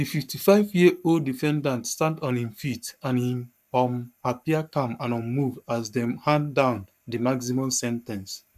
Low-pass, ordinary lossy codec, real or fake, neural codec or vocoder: 14.4 kHz; none; fake; codec, 44.1 kHz, 7.8 kbps, Pupu-Codec